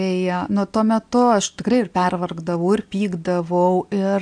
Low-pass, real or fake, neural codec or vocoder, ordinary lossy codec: 9.9 kHz; real; none; AAC, 64 kbps